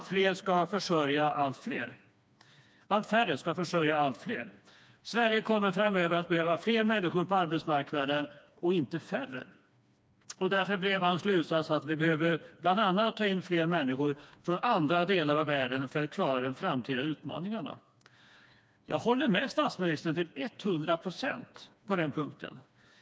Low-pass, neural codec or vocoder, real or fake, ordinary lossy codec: none; codec, 16 kHz, 2 kbps, FreqCodec, smaller model; fake; none